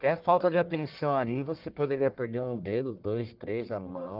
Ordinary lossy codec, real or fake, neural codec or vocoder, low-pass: Opus, 32 kbps; fake; codec, 44.1 kHz, 1.7 kbps, Pupu-Codec; 5.4 kHz